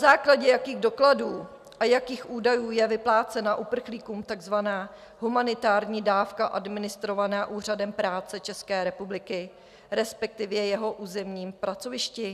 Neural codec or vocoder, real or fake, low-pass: none; real; 14.4 kHz